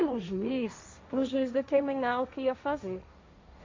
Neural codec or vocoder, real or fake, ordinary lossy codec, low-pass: codec, 16 kHz, 1.1 kbps, Voila-Tokenizer; fake; MP3, 48 kbps; 7.2 kHz